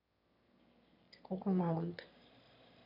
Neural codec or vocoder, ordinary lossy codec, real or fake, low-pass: autoencoder, 22.05 kHz, a latent of 192 numbers a frame, VITS, trained on one speaker; none; fake; 5.4 kHz